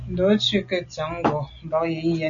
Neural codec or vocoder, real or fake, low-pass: none; real; 7.2 kHz